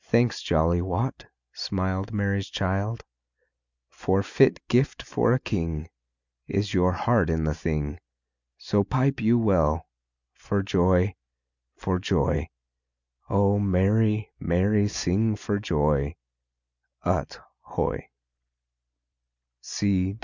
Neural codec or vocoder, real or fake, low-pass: none; real; 7.2 kHz